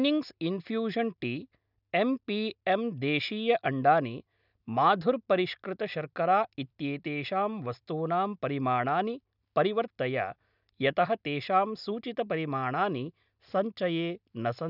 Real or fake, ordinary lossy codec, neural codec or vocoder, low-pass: real; none; none; 5.4 kHz